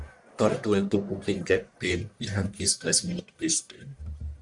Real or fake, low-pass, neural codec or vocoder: fake; 10.8 kHz; codec, 44.1 kHz, 1.7 kbps, Pupu-Codec